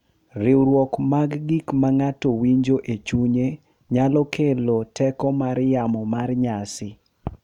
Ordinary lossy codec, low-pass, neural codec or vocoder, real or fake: none; 19.8 kHz; none; real